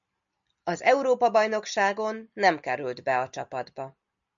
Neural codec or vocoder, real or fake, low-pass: none; real; 7.2 kHz